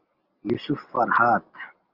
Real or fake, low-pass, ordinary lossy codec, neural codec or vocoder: real; 5.4 kHz; Opus, 32 kbps; none